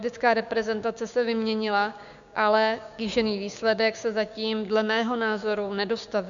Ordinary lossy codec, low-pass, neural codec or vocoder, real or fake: MP3, 96 kbps; 7.2 kHz; codec, 16 kHz, 6 kbps, DAC; fake